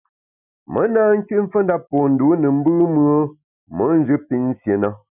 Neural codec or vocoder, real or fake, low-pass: none; real; 3.6 kHz